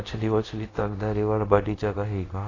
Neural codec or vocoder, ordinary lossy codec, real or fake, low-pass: codec, 24 kHz, 0.5 kbps, DualCodec; AAC, 32 kbps; fake; 7.2 kHz